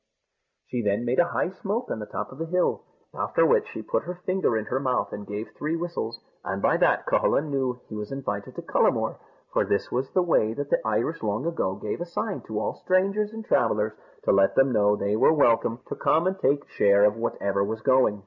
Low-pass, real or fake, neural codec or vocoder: 7.2 kHz; real; none